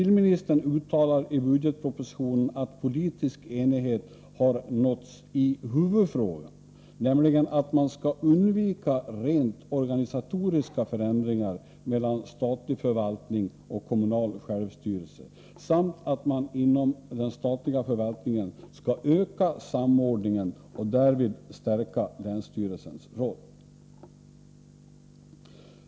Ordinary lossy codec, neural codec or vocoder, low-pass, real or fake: none; none; none; real